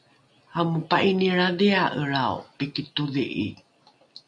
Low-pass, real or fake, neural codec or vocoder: 9.9 kHz; real; none